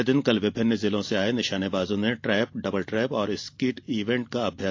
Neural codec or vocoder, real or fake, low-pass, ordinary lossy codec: none; real; 7.2 kHz; AAC, 48 kbps